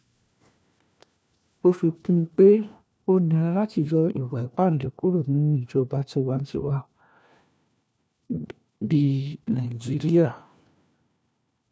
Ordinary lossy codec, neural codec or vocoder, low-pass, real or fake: none; codec, 16 kHz, 1 kbps, FunCodec, trained on LibriTTS, 50 frames a second; none; fake